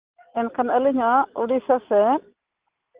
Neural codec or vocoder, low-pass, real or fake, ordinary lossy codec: none; 3.6 kHz; real; Opus, 32 kbps